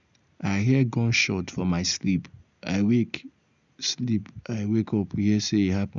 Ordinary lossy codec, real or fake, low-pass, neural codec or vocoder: none; real; 7.2 kHz; none